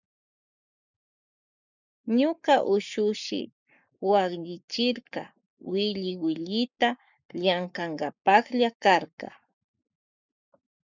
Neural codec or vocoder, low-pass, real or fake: codec, 44.1 kHz, 7.8 kbps, Pupu-Codec; 7.2 kHz; fake